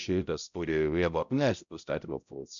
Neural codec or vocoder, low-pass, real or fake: codec, 16 kHz, 0.5 kbps, X-Codec, HuBERT features, trained on balanced general audio; 7.2 kHz; fake